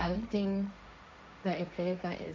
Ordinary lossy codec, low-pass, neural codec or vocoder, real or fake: none; none; codec, 16 kHz, 1.1 kbps, Voila-Tokenizer; fake